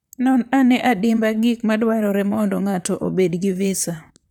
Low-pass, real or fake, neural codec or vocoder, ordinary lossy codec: 19.8 kHz; fake; vocoder, 44.1 kHz, 128 mel bands, Pupu-Vocoder; none